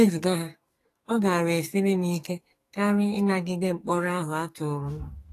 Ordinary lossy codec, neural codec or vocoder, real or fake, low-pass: AAC, 48 kbps; codec, 44.1 kHz, 2.6 kbps, SNAC; fake; 14.4 kHz